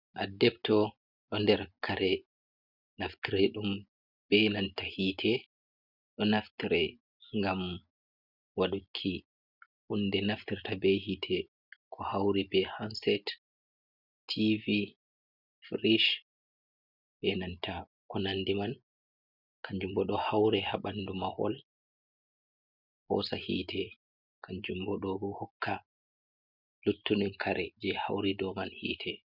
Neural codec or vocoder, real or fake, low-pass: none; real; 5.4 kHz